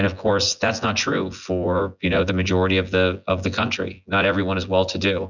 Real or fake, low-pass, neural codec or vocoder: fake; 7.2 kHz; vocoder, 24 kHz, 100 mel bands, Vocos